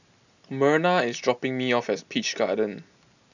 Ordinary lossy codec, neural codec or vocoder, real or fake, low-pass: none; none; real; 7.2 kHz